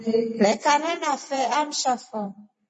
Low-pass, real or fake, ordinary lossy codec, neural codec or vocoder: 10.8 kHz; fake; MP3, 32 kbps; vocoder, 44.1 kHz, 128 mel bands every 512 samples, BigVGAN v2